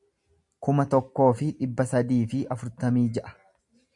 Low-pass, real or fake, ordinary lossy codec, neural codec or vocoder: 10.8 kHz; real; MP3, 96 kbps; none